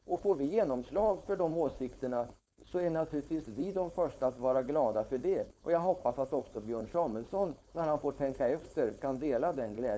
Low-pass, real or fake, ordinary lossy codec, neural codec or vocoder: none; fake; none; codec, 16 kHz, 4.8 kbps, FACodec